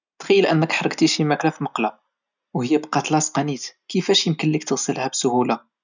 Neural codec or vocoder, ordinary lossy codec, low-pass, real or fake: none; none; 7.2 kHz; real